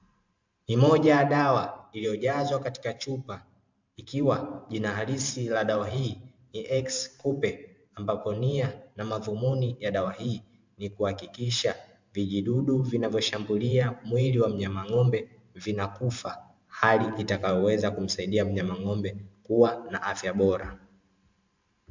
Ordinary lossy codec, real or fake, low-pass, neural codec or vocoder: MP3, 64 kbps; fake; 7.2 kHz; vocoder, 44.1 kHz, 128 mel bands every 512 samples, BigVGAN v2